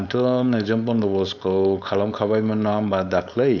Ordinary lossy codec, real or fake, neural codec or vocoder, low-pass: none; fake; codec, 16 kHz, 4.8 kbps, FACodec; 7.2 kHz